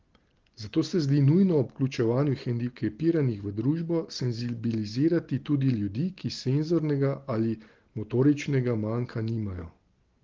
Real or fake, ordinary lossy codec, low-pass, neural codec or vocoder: real; Opus, 16 kbps; 7.2 kHz; none